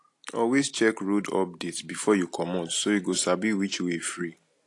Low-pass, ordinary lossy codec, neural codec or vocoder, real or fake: 10.8 kHz; AAC, 48 kbps; none; real